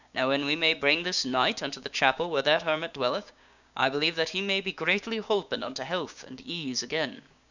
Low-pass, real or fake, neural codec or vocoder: 7.2 kHz; fake; codec, 16 kHz, 6 kbps, DAC